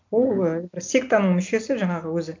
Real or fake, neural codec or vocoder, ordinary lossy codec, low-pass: real; none; MP3, 64 kbps; 7.2 kHz